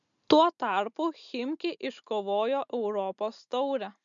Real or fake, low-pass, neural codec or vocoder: real; 7.2 kHz; none